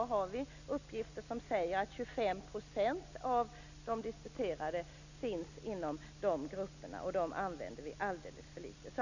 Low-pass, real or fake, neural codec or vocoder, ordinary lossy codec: 7.2 kHz; real; none; none